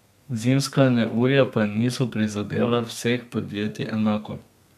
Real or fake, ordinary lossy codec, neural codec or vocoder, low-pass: fake; none; codec, 32 kHz, 1.9 kbps, SNAC; 14.4 kHz